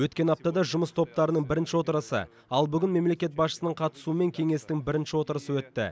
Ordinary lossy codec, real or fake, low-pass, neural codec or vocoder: none; real; none; none